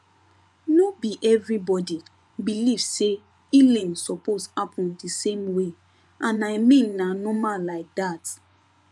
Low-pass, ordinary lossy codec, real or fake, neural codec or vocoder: none; none; fake; vocoder, 24 kHz, 100 mel bands, Vocos